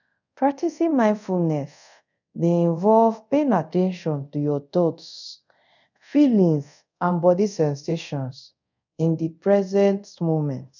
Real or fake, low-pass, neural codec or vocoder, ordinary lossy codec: fake; 7.2 kHz; codec, 24 kHz, 0.5 kbps, DualCodec; none